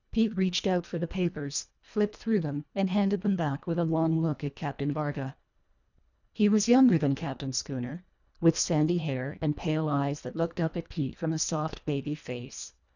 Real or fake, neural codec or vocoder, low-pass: fake; codec, 24 kHz, 1.5 kbps, HILCodec; 7.2 kHz